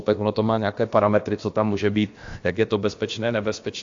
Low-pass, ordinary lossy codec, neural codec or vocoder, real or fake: 7.2 kHz; AAC, 48 kbps; codec, 16 kHz, about 1 kbps, DyCAST, with the encoder's durations; fake